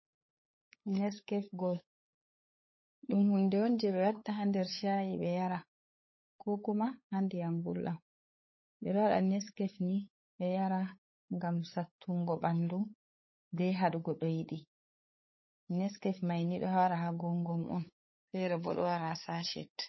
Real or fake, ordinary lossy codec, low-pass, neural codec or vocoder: fake; MP3, 24 kbps; 7.2 kHz; codec, 16 kHz, 8 kbps, FunCodec, trained on LibriTTS, 25 frames a second